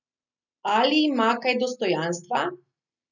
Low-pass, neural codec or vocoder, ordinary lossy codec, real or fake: 7.2 kHz; none; none; real